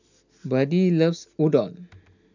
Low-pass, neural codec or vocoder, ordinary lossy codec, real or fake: 7.2 kHz; none; none; real